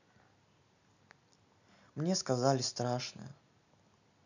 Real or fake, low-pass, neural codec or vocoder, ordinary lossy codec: real; 7.2 kHz; none; none